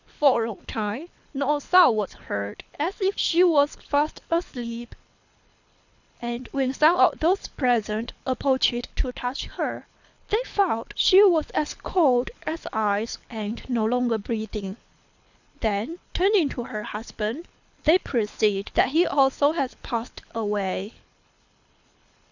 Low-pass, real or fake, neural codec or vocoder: 7.2 kHz; fake; codec, 24 kHz, 6 kbps, HILCodec